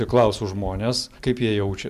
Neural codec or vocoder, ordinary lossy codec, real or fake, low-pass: none; MP3, 96 kbps; real; 14.4 kHz